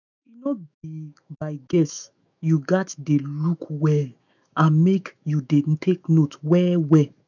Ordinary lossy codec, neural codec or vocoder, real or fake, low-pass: none; autoencoder, 48 kHz, 128 numbers a frame, DAC-VAE, trained on Japanese speech; fake; 7.2 kHz